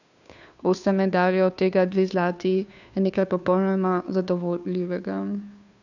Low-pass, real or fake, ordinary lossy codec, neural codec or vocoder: 7.2 kHz; fake; none; codec, 16 kHz, 2 kbps, FunCodec, trained on Chinese and English, 25 frames a second